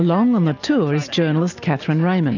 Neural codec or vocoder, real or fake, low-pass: none; real; 7.2 kHz